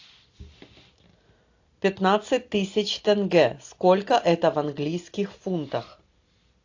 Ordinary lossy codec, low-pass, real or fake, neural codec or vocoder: AAC, 48 kbps; 7.2 kHz; real; none